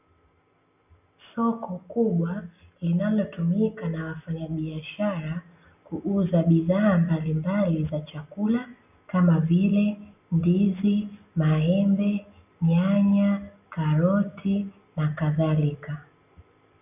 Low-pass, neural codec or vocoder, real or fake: 3.6 kHz; none; real